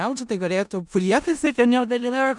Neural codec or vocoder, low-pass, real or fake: codec, 16 kHz in and 24 kHz out, 0.4 kbps, LongCat-Audio-Codec, four codebook decoder; 10.8 kHz; fake